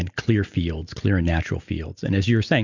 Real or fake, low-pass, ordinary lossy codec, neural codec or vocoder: real; 7.2 kHz; Opus, 64 kbps; none